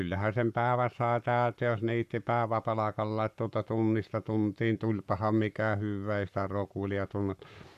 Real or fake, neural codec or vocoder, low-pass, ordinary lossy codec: fake; codec, 44.1 kHz, 7.8 kbps, Pupu-Codec; 14.4 kHz; none